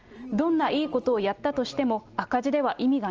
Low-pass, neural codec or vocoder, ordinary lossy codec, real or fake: 7.2 kHz; none; Opus, 24 kbps; real